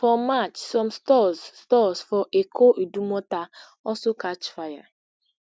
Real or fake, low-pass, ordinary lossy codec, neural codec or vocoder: real; none; none; none